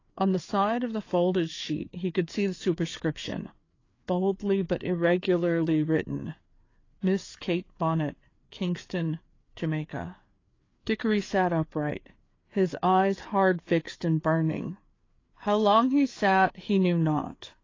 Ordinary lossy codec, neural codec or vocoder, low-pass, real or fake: AAC, 32 kbps; codec, 16 kHz, 4 kbps, FreqCodec, larger model; 7.2 kHz; fake